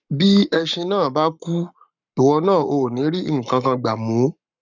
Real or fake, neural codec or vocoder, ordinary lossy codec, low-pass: fake; codec, 44.1 kHz, 7.8 kbps, Pupu-Codec; none; 7.2 kHz